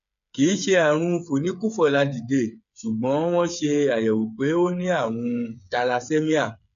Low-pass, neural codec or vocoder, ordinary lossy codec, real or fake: 7.2 kHz; codec, 16 kHz, 8 kbps, FreqCodec, smaller model; MP3, 64 kbps; fake